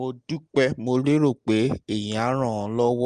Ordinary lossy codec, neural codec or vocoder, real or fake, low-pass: Opus, 24 kbps; none; real; 9.9 kHz